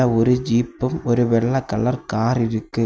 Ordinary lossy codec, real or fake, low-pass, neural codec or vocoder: none; real; none; none